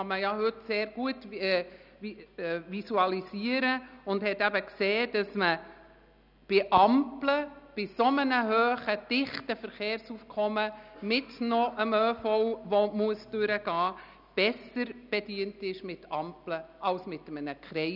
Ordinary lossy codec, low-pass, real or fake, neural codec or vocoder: none; 5.4 kHz; real; none